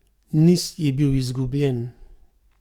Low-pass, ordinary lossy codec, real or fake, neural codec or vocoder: 19.8 kHz; Opus, 64 kbps; fake; codec, 44.1 kHz, 7.8 kbps, DAC